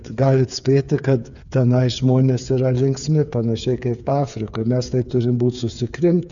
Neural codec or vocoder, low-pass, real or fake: codec, 16 kHz, 8 kbps, FreqCodec, smaller model; 7.2 kHz; fake